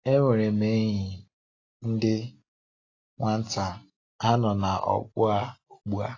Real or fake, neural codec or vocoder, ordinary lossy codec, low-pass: real; none; AAC, 32 kbps; 7.2 kHz